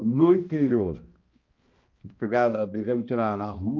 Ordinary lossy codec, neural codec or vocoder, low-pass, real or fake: Opus, 24 kbps; codec, 16 kHz, 1 kbps, X-Codec, HuBERT features, trained on balanced general audio; 7.2 kHz; fake